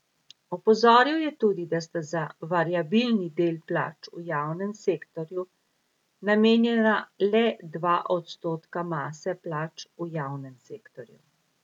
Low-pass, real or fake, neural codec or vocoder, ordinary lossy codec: 19.8 kHz; real; none; none